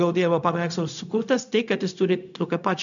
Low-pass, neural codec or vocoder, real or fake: 7.2 kHz; codec, 16 kHz, 0.4 kbps, LongCat-Audio-Codec; fake